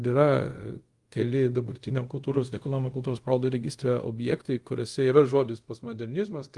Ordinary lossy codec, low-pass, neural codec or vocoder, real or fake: Opus, 24 kbps; 10.8 kHz; codec, 24 kHz, 0.5 kbps, DualCodec; fake